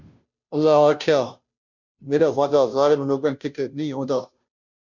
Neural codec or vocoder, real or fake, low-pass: codec, 16 kHz, 0.5 kbps, FunCodec, trained on Chinese and English, 25 frames a second; fake; 7.2 kHz